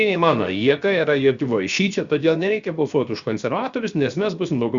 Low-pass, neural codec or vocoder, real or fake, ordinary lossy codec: 7.2 kHz; codec, 16 kHz, 0.7 kbps, FocalCodec; fake; Opus, 64 kbps